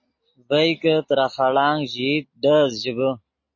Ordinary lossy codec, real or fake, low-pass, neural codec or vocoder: MP3, 32 kbps; real; 7.2 kHz; none